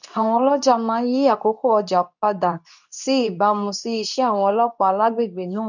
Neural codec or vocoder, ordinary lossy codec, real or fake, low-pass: codec, 24 kHz, 0.9 kbps, WavTokenizer, medium speech release version 2; none; fake; 7.2 kHz